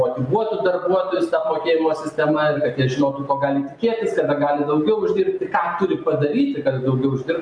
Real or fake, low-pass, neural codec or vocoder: real; 9.9 kHz; none